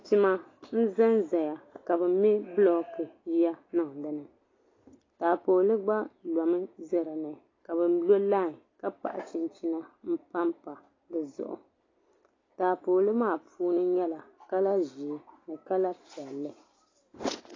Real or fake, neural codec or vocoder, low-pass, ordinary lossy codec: real; none; 7.2 kHz; AAC, 32 kbps